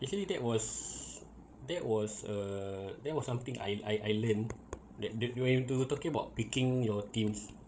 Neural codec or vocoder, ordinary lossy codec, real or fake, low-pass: codec, 16 kHz, 16 kbps, FreqCodec, larger model; none; fake; none